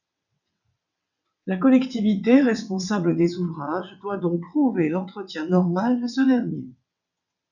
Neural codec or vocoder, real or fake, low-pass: vocoder, 22.05 kHz, 80 mel bands, WaveNeXt; fake; 7.2 kHz